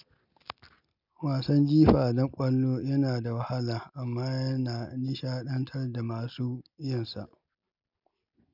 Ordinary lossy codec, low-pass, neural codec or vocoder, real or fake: none; 5.4 kHz; none; real